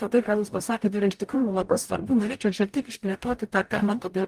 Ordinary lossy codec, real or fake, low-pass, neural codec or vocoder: Opus, 32 kbps; fake; 14.4 kHz; codec, 44.1 kHz, 0.9 kbps, DAC